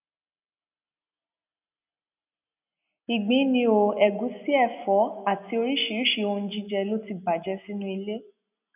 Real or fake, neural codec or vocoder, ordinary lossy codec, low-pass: real; none; none; 3.6 kHz